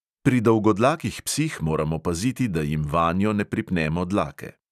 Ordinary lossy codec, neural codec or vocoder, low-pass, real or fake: none; none; 14.4 kHz; real